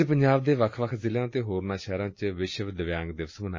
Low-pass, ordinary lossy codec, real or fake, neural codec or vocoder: 7.2 kHz; MP3, 32 kbps; real; none